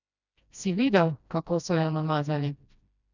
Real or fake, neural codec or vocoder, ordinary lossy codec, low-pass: fake; codec, 16 kHz, 1 kbps, FreqCodec, smaller model; none; 7.2 kHz